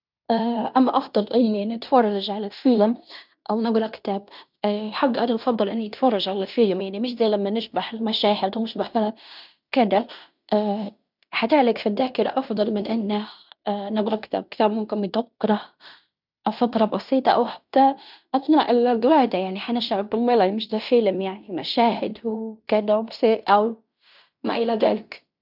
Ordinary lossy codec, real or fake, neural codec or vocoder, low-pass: none; fake; codec, 16 kHz in and 24 kHz out, 0.9 kbps, LongCat-Audio-Codec, fine tuned four codebook decoder; 5.4 kHz